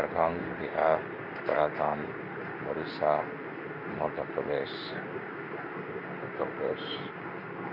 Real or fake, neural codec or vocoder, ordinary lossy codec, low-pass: fake; codec, 16 kHz in and 24 kHz out, 1 kbps, XY-Tokenizer; none; 5.4 kHz